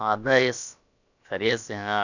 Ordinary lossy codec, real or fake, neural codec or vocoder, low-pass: none; fake; codec, 16 kHz, about 1 kbps, DyCAST, with the encoder's durations; 7.2 kHz